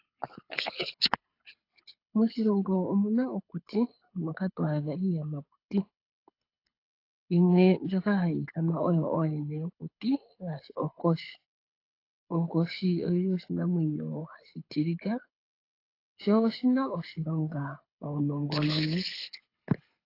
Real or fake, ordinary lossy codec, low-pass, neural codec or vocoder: fake; AAC, 32 kbps; 5.4 kHz; codec, 24 kHz, 6 kbps, HILCodec